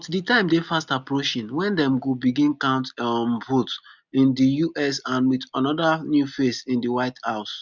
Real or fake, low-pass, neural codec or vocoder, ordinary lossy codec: real; 7.2 kHz; none; none